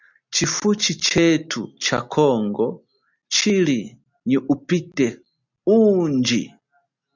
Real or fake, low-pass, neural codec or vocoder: real; 7.2 kHz; none